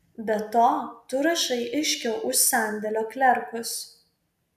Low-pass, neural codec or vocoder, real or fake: 14.4 kHz; none; real